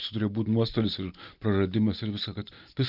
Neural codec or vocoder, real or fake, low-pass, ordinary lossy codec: none; real; 5.4 kHz; Opus, 24 kbps